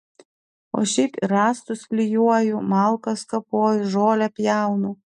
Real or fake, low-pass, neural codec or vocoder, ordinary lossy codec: real; 10.8 kHz; none; MP3, 64 kbps